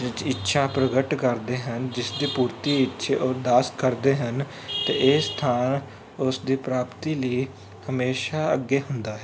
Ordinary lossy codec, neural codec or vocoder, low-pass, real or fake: none; none; none; real